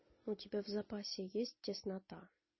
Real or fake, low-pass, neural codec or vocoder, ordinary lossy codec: real; 7.2 kHz; none; MP3, 24 kbps